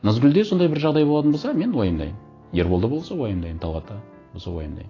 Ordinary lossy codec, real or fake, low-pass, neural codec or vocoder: AAC, 32 kbps; real; 7.2 kHz; none